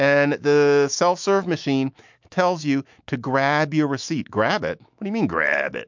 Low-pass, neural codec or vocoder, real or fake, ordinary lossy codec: 7.2 kHz; autoencoder, 48 kHz, 128 numbers a frame, DAC-VAE, trained on Japanese speech; fake; MP3, 64 kbps